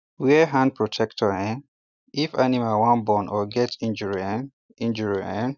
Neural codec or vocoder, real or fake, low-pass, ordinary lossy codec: none; real; 7.2 kHz; none